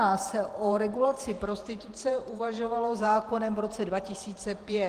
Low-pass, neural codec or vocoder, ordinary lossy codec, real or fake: 14.4 kHz; vocoder, 48 kHz, 128 mel bands, Vocos; Opus, 24 kbps; fake